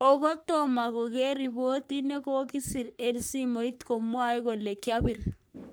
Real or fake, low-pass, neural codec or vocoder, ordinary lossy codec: fake; none; codec, 44.1 kHz, 3.4 kbps, Pupu-Codec; none